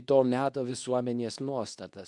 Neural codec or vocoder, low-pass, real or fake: codec, 24 kHz, 0.9 kbps, WavTokenizer, medium speech release version 2; 10.8 kHz; fake